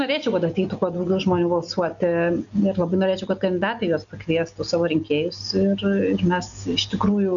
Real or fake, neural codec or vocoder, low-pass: real; none; 7.2 kHz